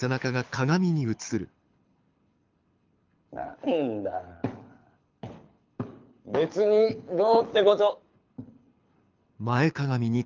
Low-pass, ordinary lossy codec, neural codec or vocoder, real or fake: 7.2 kHz; Opus, 24 kbps; codec, 24 kHz, 6 kbps, HILCodec; fake